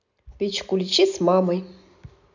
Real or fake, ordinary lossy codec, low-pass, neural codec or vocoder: real; none; 7.2 kHz; none